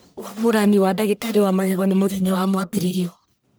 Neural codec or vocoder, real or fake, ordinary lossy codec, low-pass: codec, 44.1 kHz, 1.7 kbps, Pupu-Codec; fake; none; none